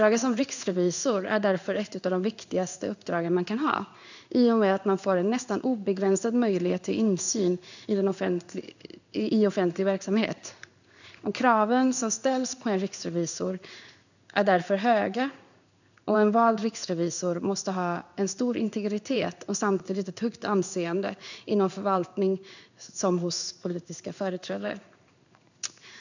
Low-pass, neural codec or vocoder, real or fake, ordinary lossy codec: 7.2 kHz; codec, 16 kHz in and 24 kHz out, 1 kbps, XY-Tokenizer; fake; none